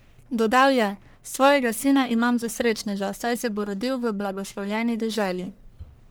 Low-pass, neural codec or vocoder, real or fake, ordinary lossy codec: none; codec, 44.1 kHz, 1.7 kbps, Pupu-Codec; fake; none